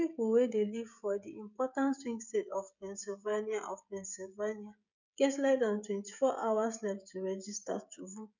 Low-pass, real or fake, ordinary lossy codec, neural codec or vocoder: 7.2 kHz; fake; none; codec, 16 kHz, 16 kbps, FreqCodec, smaller model